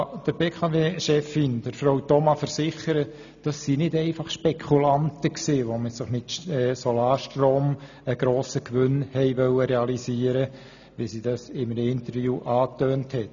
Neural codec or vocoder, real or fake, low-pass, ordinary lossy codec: none; real; 7.2 kHz; none